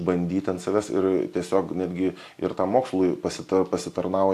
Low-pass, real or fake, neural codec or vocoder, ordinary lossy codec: 14.4 kHz; real; none; AAC, 64 kbps